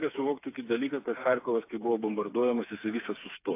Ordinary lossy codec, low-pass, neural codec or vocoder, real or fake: AAC, 24 kbps; 3.6 kHz; codec, 16 kHz, 4 kbps, FreqCodec, smaller model; fake